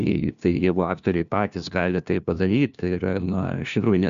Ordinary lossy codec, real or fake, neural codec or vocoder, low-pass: AAC, 96 kbps; fake; codec, 16 kHz, 1 kbps, FunCodec, trained on LibriTTS, 50 frames a second; 7.2 kHz